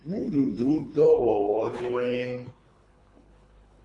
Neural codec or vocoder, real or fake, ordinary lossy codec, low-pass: codec, 24 kHz, 3 kbps, HILCodec; fake; AAC, 48 kbps; 10.8 kHz